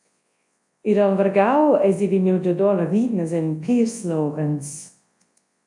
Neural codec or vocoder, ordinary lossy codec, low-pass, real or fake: codec, 24 kHz, 0.9 kbps, WavTokenizer, large speech release; AAC, 64 kbps; 10.8 kHz; fake